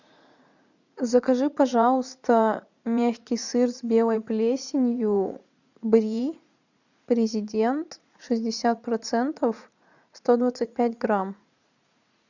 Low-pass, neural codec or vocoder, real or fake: 7.2 kHz; vocoder, 44.1 kHz, 80 mel bands, Vocos; fake